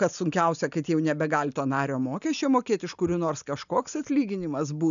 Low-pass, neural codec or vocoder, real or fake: 7.2 kHz; none; real